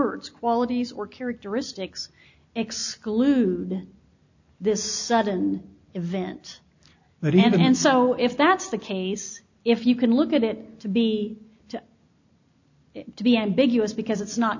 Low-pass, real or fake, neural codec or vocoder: 7.2 kHz; real; none